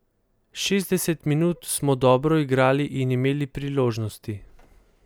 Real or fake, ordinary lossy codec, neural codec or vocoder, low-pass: real; none; none; none